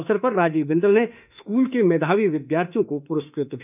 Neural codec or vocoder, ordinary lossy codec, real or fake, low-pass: autoencoder, 48 kHz, 32 numbers a frame, DAC-VAE, trained on Japanese speech; none; fake; 3.6 kHz